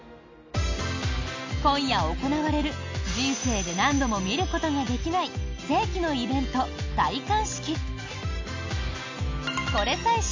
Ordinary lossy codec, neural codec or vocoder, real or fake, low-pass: MP3, 64 kbps; none; real; 7.2 kHz